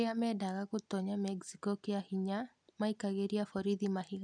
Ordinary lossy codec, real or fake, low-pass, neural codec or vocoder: none; real; 9.9 kHz; none